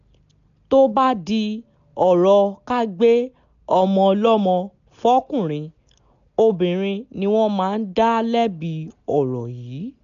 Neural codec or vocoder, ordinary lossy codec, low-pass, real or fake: none; none; 7.2 kHz; real